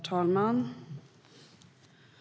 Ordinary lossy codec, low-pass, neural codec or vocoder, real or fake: none; none; none; real